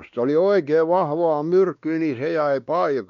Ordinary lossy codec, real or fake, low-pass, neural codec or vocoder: none; fake; 7.2 kHz; codec, 16 kHz, 2 kbps, X-Codec, WavLM features, trained on Multilingual LibriSpeech